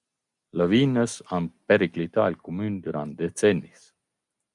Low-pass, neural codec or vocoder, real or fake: 10.8 kHz; none; real